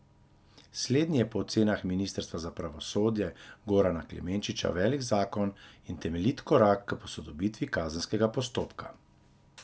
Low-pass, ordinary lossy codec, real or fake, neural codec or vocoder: none; none; real; none